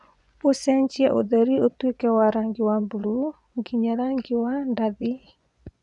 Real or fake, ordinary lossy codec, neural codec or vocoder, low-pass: fake; none; vocoder, 24 kHz, 100 mel bands, Vocos; 10.8 kHz